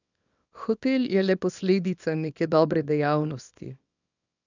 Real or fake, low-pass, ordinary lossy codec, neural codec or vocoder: fake; 7.2 kHz; none; codec, 24 kHz, 0.9 kbps, WavTokenizer, small release